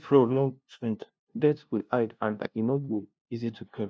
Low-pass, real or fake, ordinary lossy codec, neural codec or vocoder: none; fake; none; codec, 16 kHz, 0.5 kbps, FunCodec, trained on LibriTTS, 25 frames a second